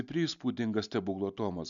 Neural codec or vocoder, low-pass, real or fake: none; 7.2 kHz; real